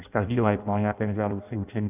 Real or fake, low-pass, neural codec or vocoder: fake; 3.6 kHz; codec, 16 kHz in and 24 kHz out, 0.6 kbps, FireRedTTS-2 codec